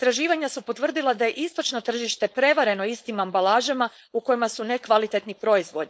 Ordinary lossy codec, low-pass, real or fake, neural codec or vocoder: none; none; fake; codec, 16 kHz, 4.8 kbps, FACodec